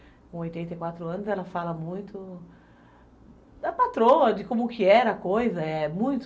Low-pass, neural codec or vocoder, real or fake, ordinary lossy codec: none; none; real; none